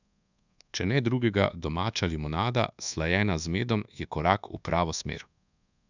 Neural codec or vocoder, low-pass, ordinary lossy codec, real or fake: codec, 24 kHz, 1.2 kbps, DualCodec; 7.2 kHz; none; fake